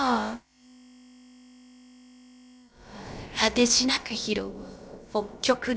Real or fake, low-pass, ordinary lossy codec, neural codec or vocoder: fake; none; none; codec, 16 kHz, about 1 kbps, DyCAST, with the encoder's durations